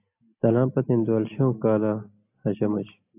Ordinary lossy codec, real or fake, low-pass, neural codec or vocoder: AAC, 32 kbps; real; 3.6 kHz; none